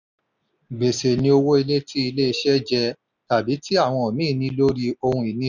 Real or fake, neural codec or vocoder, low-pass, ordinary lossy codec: real; none; 7.2 kHz; none